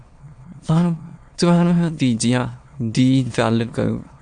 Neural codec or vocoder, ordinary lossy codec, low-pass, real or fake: autoencoder, 22.05 kHz, a latent of 192 numbers a frame, VITS, trained on many speakers; AAC, 64 kbps; 9.9 kHz; fake